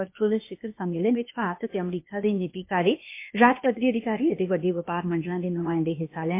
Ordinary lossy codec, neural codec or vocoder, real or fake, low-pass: MP3, 24 kbps; codec, 16 kHz, 0.8 kbps, ZipCodec; fake; 3.6 kHz